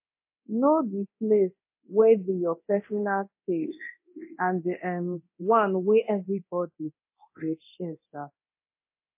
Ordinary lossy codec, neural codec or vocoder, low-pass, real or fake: MP3, 24 kbps; codec, 24 kHz, 0.9 kbps, DualCodec; 3.6 kHz; fake